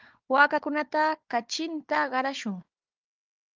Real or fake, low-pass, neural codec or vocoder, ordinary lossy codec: fake; 7.2 kHz; codec, 16 kHz, 4 kbps, FunCodec, trained on Chinese and English, 50 frames a second; Opus, 24 kbps